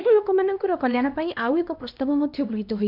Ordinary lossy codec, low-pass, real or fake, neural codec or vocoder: none; 5.4 kHz; fake; codec, 16 kHz, 1 kbps, X-Codec, HuBERT features, trained on LibriSpeech